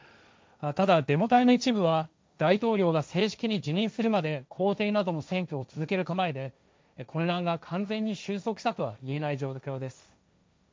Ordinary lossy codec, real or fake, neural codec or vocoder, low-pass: none; fake; codec, 16 kHz, 1.1 kbps, Voila-Tokenizer; none